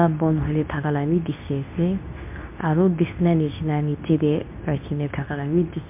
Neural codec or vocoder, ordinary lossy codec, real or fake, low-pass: codec, 24 kHz, 0.9 kbps, WavTokenizer, medium speech release version 2; MP3, 32 kbps; fake; 3.6 kHz